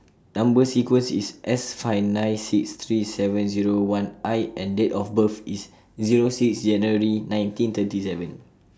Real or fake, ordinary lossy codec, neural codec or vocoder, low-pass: real; none; none; none